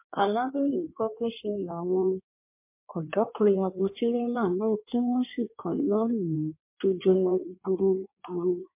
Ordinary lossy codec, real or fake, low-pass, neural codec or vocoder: MP3, 24 kbps; fake; 3.6 kHz; codec, 16 kHz in and 24 kHz out, 1.1 kbps, FireRedTTS-2 codec